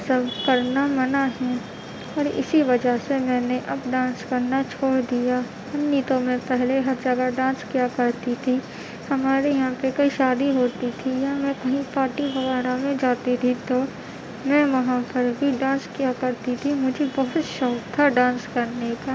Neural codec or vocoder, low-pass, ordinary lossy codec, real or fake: none; none; none; real